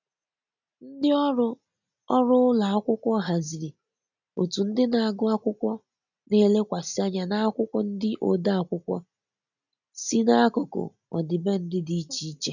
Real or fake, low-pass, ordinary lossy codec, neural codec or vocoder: real; 7.2 kHz; none; none